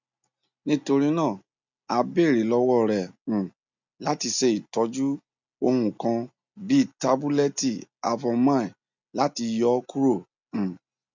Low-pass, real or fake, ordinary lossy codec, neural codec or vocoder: 7.2 kHz; real; none; none